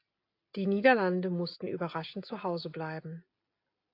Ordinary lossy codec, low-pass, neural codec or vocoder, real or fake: AAC, 32 kbps; 5.4 kHz; none; real